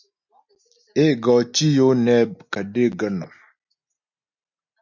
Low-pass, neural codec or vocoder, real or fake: 7.2 kHz; none; real